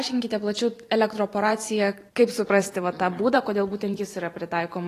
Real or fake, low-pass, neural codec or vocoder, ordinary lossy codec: fake; 14.4 kHz; vocoder, 44.1 kHz, 128 mel bands every 512 samples, BigVGAN v2; AAC, 48 kbps